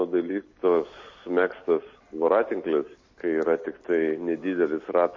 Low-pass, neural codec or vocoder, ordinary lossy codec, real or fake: 7.2 kHz; none; MP3, 32 kbps; real